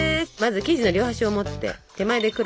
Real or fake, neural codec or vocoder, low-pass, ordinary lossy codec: real; none; none; none